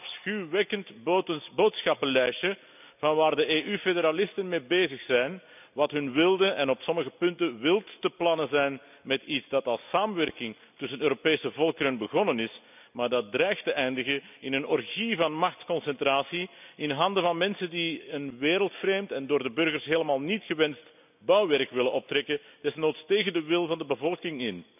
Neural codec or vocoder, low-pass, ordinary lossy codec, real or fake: none; 3.6 kHz; none; real